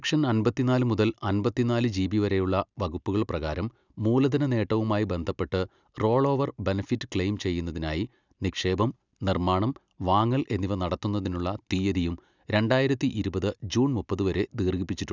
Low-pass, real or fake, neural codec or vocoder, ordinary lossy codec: 7.2 kHz; real; none; none